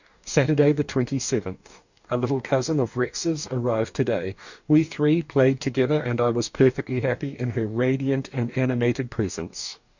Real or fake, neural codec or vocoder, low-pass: fake; codec, 44.1 kHz, 2.6 kbps, DAC; 7.2 kHz